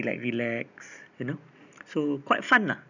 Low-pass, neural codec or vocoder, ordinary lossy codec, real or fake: 7.2 kHz; none; none; real